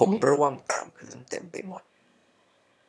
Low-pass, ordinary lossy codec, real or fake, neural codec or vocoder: none; none; fake; autoencoder, 22.05 kHz, a latent of 192 numbers a frame, VITS, trained on one speaker